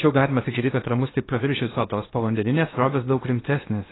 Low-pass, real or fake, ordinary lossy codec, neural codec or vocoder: 7.2 kHz; fake; AAC, 16 kbps; codec, 16 kHz in and 24 kHz out, 0.8 kbps, FocalCodec, streaming, 65536 codes